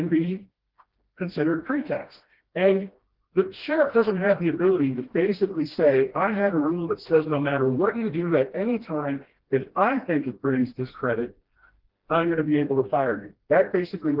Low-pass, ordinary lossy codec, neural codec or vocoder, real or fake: 5.4 kHz; Opus, 16 kbps; codec, 16 kHz, 1 kbps, FreqCodec, smaller model; fake